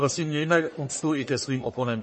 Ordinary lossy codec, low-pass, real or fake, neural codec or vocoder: MP3, 32 kbps; 10.8 kHz; fake; codec, 44.1 kHz, 1.7 kbps, Pupu-Codec